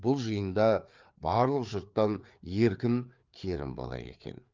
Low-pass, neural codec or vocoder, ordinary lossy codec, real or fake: 7.2 kHz; codec, 16 kHz, 4 kbps, FreqCodec, larger model; Opus, 24 kbps; fake